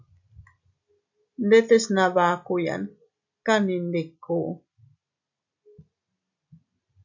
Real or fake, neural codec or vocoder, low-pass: real; none; 7.2 kHz